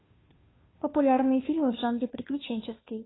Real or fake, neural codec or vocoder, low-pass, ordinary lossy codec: fake; codec, 16 kHz, 2 kbps, FunCodec, trained on Chinese and English, 25 frames a second; 7.2 kHz; AAC, 16 kbps